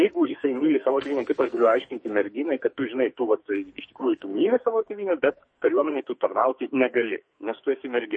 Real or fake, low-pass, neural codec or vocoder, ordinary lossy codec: fake; 9.9 kHz; codec, 44.1 kHz, 2.6 kbps, SNAC; MP3, 32 kbps